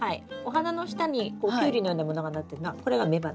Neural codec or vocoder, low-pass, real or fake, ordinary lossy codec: none; none; real; none